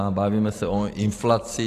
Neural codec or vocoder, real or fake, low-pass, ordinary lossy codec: vocoder, 44.1 kHz, 128 mel bands every 512 samples, BigVGAN v2; fake; 14.4 kHz; AAC, 48 kbps